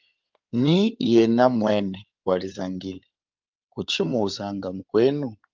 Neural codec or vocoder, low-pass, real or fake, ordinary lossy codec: codec, 16 kHz in and 24 kHz out, 2.2 kbps, FireRedTTS-2 codec; 7.2 kHz; fake; Opus, 32 kbps